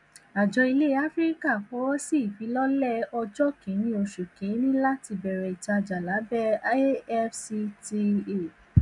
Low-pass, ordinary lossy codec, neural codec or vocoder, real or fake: 10.8 kHz; none; none; real